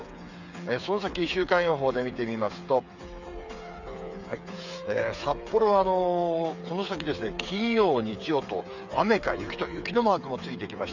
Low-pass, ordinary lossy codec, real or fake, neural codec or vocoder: 7.2 kHz; none; fake; codec, 16 kHz, 8 kbps, FreqCodec, smaller model